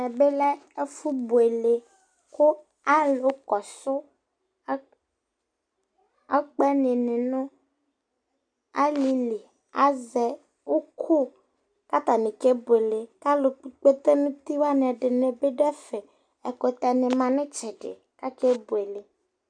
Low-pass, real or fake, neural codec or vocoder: 9.9 kHz; real; none